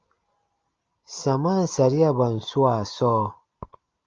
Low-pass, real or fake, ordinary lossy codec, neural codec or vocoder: 7.2 kHz; real; Opus, 24 kbps; none